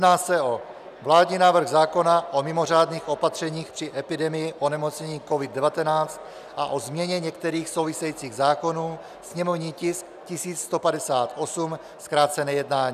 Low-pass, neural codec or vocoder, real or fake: 14.4 kHz; none; real